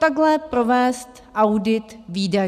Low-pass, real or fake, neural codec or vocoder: 14.4 kHz; real; none